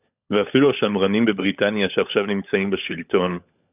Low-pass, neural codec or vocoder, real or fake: 3.6 kHz; codec, 16 kHz, 16 kbps, FunCodec, trained on LibriTTS, 50 frames a second; fake